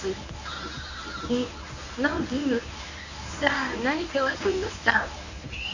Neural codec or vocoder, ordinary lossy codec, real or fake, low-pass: codec, 24 kHz, 0.9 kbps, WavTokenizer, medium speech release version 1; none; fake; 7.2 kHz